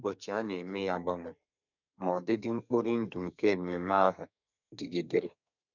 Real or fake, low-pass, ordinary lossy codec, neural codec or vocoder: fake; 7.2 kHz; none; codec, 32 kHz, 1.9 kbps, SNAC